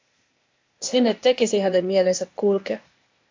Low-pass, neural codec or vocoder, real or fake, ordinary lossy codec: 7.2 kHz; codec, 16 kHz, 0.8 kbps, ZipCodec; fake; MP3, 48 kbps